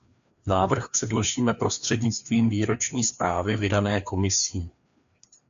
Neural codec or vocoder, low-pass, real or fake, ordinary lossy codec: codec, 16 kHz, 2 kbps, FreqCodec, larger model; 7.2 kHz; fake; MP3, 64 kbps